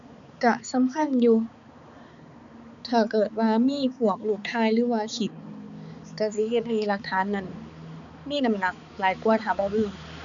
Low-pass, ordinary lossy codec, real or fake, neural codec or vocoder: 7.2 kHz; none; fake; codec, 16 kHz, 4 kbps, X-Codec, HuBERT features, trained on balanced general audio